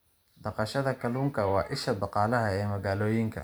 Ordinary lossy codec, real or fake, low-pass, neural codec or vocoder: none; fake; none; vocoder, 44.1 kHz, 128 mel bands every 512 samples, BigVGAN v2